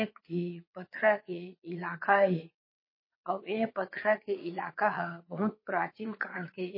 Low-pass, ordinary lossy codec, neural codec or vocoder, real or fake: 5.4 kHz; MP3, 24 kbps; vocoder, 22.05 kHz, 80 mel bands, WaveNeXt; fake